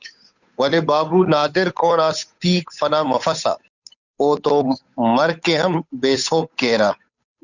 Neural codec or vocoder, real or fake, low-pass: codec, 16 kHz, 8 kbps, FunCodec, trained on Chinese and English, 25 frames a second; fake; 7.2 kHz